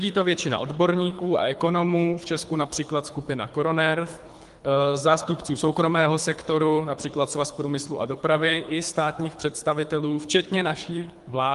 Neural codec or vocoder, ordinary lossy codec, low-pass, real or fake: codec, 24 kHz, 3 kbps, HILCodec; Opus, 32 kbps; 10.8 kHz; fake